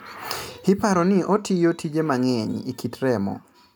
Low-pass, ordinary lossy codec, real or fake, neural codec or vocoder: 19.8 kHz; none; real; none